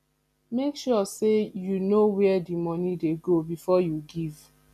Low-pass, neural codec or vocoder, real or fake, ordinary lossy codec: 14.4 kHz; none; real; none